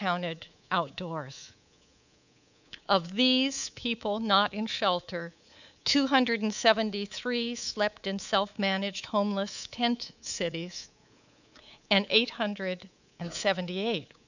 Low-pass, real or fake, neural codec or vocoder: 7.2 kHz; fake; codec, 24 kHz, 3.1 kbps, DualCodec